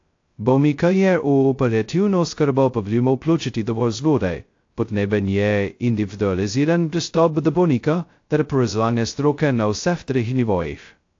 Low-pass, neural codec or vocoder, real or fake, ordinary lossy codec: 7.2 kHz; codec, 16 kHz, 0.2 kbps, FocalCodec; fake; AAC, 48 kbps